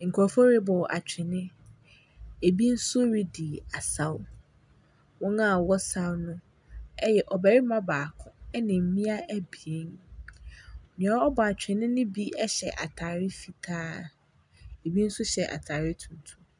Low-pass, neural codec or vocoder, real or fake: 10.8 kHz; none; real